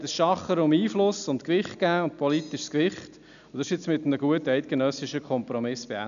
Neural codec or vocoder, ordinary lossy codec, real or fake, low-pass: none; none; real; 7.2 kHz